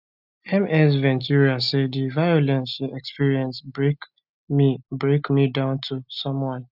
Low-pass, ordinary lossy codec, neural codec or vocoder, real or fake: 5.4 kHz; none; none; real